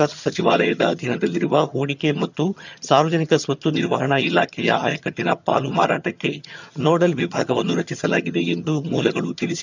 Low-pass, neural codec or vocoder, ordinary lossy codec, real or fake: 7.2 kHz; vocoder, 22.05 kHz, 80 mel bands, HiFi-GAN; none; fake